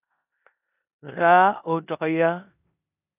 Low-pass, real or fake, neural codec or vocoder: 3.6 kHz; fake; codec, 16 kHz in and 24 kHz out, 0.9 kbps, LongCat-Audio-Codec, four codebook decoder